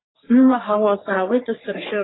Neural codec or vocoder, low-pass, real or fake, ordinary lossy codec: codec, 44.1 kHz, 3.4 kbps, Pupu-Codec; 7.2 kHz; fake; AAC, 16 kbps